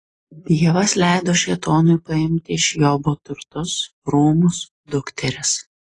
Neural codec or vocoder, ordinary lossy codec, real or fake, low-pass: none; AAC, 32 kbps; real; 10.8 kHz